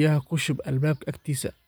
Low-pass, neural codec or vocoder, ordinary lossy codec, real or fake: none; none; none; real